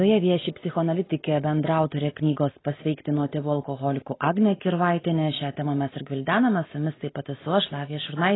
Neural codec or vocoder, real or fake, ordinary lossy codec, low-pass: none; real; AAC, 16 kbps; 7.2 kHz